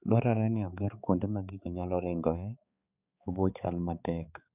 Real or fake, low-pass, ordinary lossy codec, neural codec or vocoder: fake; 3.6 kHz; none; codec, 16 kHz, 4 kbps, X-Codec, HuBERT features, trained on balanced general audio